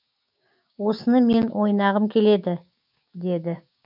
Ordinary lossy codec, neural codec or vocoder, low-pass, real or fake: none; codec, 16 kHz, 6 kbps, DAC; 5.4 kHz; fake